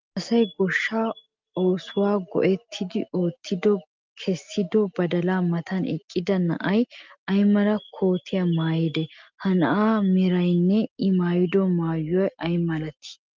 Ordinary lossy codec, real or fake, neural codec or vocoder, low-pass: Opus, 32 kbps; real; none; 7.2 kHz